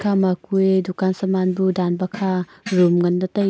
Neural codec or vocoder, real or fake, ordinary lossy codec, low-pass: none; real; none; none